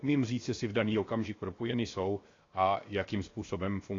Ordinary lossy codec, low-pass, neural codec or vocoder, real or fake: AAC, 32 kbps; 7.2 kHz; codec, 16 kHz, 0.7 kbps, FocalCodec; fake